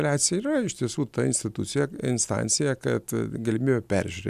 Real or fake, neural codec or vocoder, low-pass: real; none; 14.4 kHz